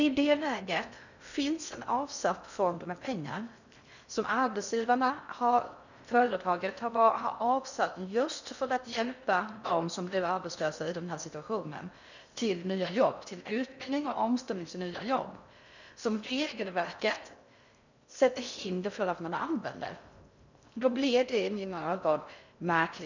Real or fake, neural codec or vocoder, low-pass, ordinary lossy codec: fake; codec, 16 kHz in and 24 kHz out, 0.6 kbps, FocalCodec, streaming, 2048 codes; 7.2 kHz; none